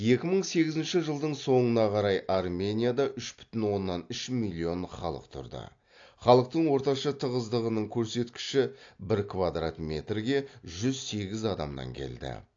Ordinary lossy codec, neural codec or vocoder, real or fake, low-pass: AAC, 48 kbps; none; real; 7.2 kHz